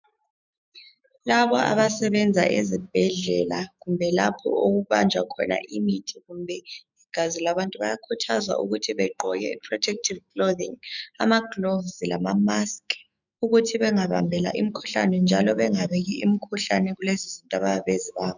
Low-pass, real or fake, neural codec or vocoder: 7.2 kHz; fake; autoencoder, 48 kHz, 128 numbers a frame, DAC-VAE, trained on Japanese speech